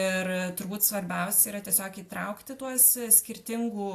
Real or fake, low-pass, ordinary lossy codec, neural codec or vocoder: real; 14.4 kHz; AAC, 64 kbps; none